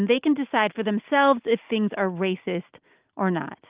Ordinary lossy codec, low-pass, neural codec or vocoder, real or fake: Opus, 32 kbps; 3.6 kHz; none; real